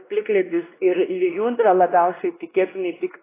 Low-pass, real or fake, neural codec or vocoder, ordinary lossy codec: 3.6 kHz; fake; codec, 16 kHz, 1 kbps, X-Codec, WavLM features, trained on Multilingual LibriSpeech; AAC, 16 kbps